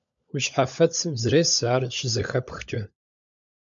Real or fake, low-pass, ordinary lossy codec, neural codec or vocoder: fake; 7.2 kHz; MP3, 64 kbps; codec, 16 kHz, 16 kbps, FunCodec, trained on LibriTTS, 50 frames a second